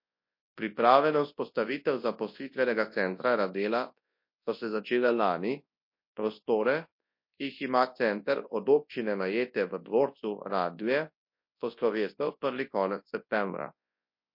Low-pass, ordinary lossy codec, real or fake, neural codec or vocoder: 5.4 kHz; MP3, 32 kbps; fake; codec, 24 kHz, 0.9 kbps, WavTokenizer, large speech release